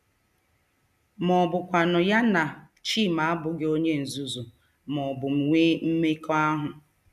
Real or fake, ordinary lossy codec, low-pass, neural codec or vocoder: real; none; 14.4 kHz; none